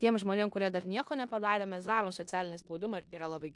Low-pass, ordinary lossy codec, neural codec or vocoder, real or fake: 10.8 kHz; AAC, 64 kbps; codec, 16 kHz in and 24 kHz out, 0.9 kbps, LongCat-Audio-Codec, four codebook decoder; fake